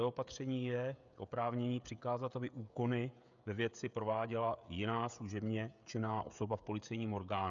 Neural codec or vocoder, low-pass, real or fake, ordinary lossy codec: codec, 16 kHz, 16 kbps, FreqCodec, smaller model; 7.2 kHz; fake; MP3, 96 kbps